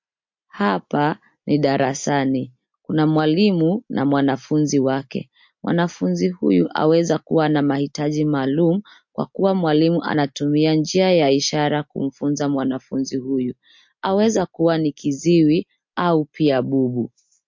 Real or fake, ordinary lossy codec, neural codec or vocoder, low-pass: real; MP3, 48 kbps; none; 7.2 kHz